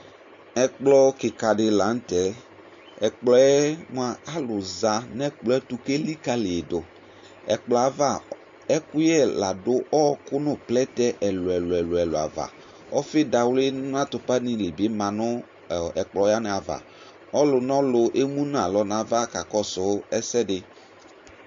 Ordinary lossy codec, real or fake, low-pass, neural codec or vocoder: MP3, 48 kbps; real; 7.2 kHz; none